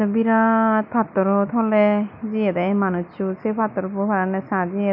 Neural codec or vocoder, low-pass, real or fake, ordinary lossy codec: none; 5.4 kHz; real; none